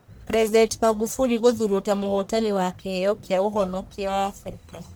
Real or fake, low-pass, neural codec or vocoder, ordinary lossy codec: fake; none; codec, 44.1 kHz, 1.7 kbps, Pupu-Codec; none